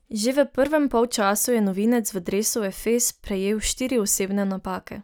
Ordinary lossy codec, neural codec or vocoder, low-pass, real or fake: none; none; none; real